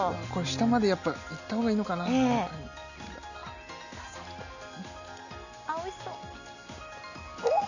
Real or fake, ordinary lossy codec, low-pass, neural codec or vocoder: real; none; 7.2 kHz; none